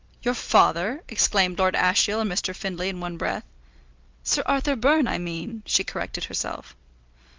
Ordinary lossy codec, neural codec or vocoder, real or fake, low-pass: Opus, 32 kbps; none; real; 7.2 kHz